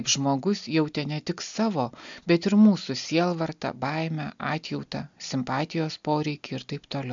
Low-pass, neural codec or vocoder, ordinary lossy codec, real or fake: 7.2 kHz; none; MP3, 64 kbps; real